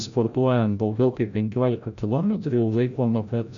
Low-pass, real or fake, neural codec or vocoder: 7.2 kHz; fake; codec, 16 kHz, 0.5 kbps, FreqCodec, larger model